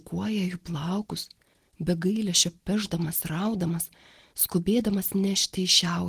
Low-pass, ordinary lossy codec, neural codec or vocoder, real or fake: 14.4 kHz; Opus, 16 kbps; none; real